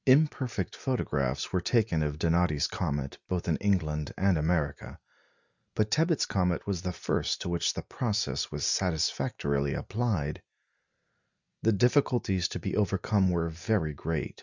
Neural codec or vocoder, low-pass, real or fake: none; 7.2 kHz; real